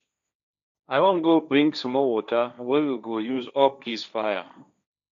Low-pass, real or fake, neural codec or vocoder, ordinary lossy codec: 7.2 kHz; fake; codec, 16 kHz, 1.1 kbps, Voila-Tokenizer; none